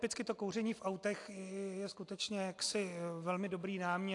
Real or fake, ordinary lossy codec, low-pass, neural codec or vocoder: real; AAC, 48 kbps; 10.8 kHz; none